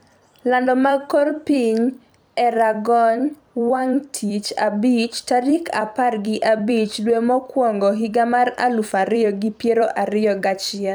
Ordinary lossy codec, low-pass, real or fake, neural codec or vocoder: none; none; fake; vocoder, 44.1 kHz, 128 mel bands every 256 samples, BigVGAN v2